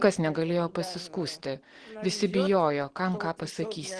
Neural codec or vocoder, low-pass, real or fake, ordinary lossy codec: none; 9.9 kHz; real; Opus, 16 kbps